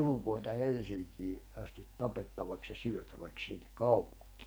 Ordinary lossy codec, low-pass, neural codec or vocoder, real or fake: none; none; codec, 44.1 kHz, 2.6 kbps, SNAC; fake